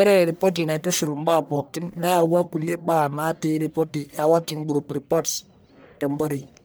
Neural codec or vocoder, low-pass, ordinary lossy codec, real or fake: codec, 44.1 kHz, 1.7 kbps, Pupu-Codec; none; none; fake